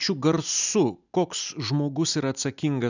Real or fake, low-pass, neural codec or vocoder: real; 7.2 kHz; none